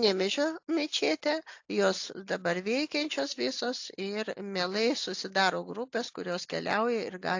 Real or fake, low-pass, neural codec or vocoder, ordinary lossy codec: real; 7.2 kHz; none; AAC, 48 kbps